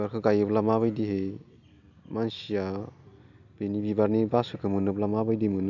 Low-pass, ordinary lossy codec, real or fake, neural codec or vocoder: 7.2 kHz; none; real; none